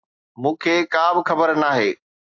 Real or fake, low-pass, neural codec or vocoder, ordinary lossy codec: real; 7.2 kHz; none; AAC, 48 kbps